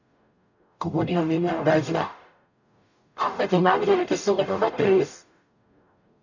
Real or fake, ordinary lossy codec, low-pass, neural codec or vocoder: fake; none; 7.2 kHz; codec, 44.1 kHz, 0.9 kbps, DAC